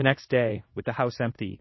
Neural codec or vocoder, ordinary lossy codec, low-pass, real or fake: codec, 24 kHz, 0.5 kbps, DualCodec; MP3, 24 kbps; 7.2 kHz; fake